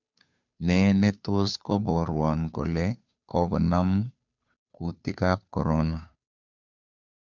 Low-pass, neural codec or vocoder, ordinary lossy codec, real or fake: 7.2 kHz; codec, 16 kHz, 2 kbps, FunCodec, trained on Chinese and English, 25 frames a second; none; fake